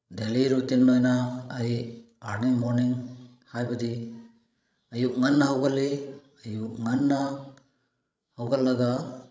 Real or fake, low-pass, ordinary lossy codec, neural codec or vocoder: fake; none; none; codec, 16 kHz, 16 kbps, FreqCodec, larger model